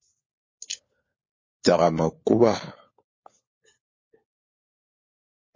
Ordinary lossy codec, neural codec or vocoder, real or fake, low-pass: MP3, 32 kbps; codec, 16 kHz, 4 kbps, FunCodec, trained on LibriTTS, 50 frames a second; fake; 7.2 kHz